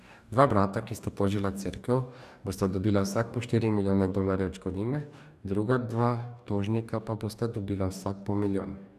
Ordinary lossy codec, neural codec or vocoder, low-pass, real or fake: none; codec, 44.1 kHz, 2.6 kbps, DAC; 14.4 kHz; fake